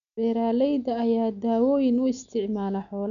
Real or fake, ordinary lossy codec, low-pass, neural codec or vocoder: real; none; 7.2 kHz; none